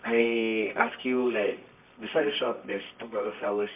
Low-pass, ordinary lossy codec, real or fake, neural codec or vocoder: 3.6 kHz; MP3, 32 kbps; fake; codec, 24 kHz, 0.9 kbps, WavTokenizer, medium music audio release